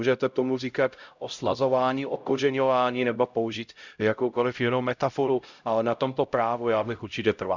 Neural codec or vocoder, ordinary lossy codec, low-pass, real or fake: codec, 16 kHz, 0.5 kbps, X-Codec, HuBERT features, trained on LibriSpeech; Opus, 64 kbps; 7.2 kHz; fake